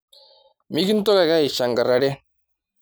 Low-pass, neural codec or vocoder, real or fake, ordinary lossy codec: none; none; real; none